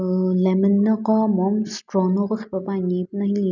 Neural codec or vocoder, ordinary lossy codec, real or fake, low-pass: none; none; real; 7.2 kHz